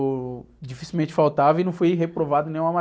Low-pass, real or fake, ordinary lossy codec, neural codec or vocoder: none; real; none; none